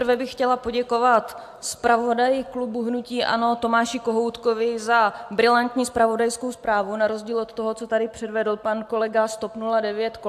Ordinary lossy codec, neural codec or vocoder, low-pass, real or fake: AAC, 96 kbps; none; 14.4 kHz; real